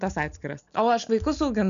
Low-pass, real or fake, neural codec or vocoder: 7.2 kHz; real; none